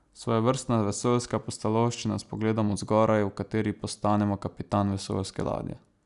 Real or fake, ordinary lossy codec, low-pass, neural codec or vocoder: real; none; 10.8 kHz; none